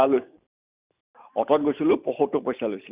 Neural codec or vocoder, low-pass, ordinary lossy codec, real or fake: none; 3.6 kHz; Opus, 64 kbps; real